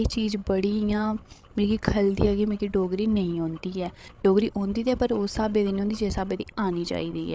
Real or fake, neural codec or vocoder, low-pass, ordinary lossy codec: fake; codec, 16 kHz, 8 kbps, FreqCodec, larger model; none; none